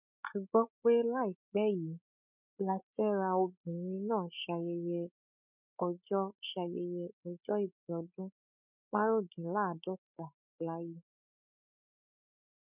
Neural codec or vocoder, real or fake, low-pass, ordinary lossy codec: codec, 16 kHz, 16 kbps, FreqCodec, larger model; fake; 3.6 kHz; none